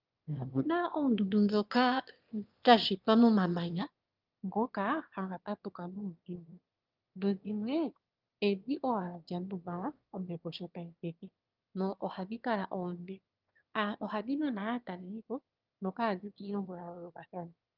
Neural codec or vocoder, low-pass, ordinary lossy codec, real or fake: autoencoder, 22.05 kHz, a latent of 192 numbers a frame, VITS, trained on one speaker; 5.4 kHz; Opus, 24 kbps; fake